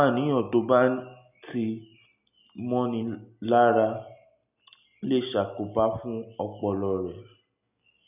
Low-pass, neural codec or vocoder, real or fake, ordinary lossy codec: 3.6 kHz; none; real; none